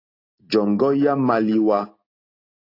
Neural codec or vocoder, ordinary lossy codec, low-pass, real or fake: none; AAC, 32 kbps; 5.4 kHz; real